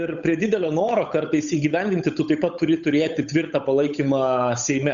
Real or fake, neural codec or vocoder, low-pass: fake; codec, 16 kHz, 8 kbps, FunCodec, trained on Chinese and English, 25 frames a second; 7.2 kHz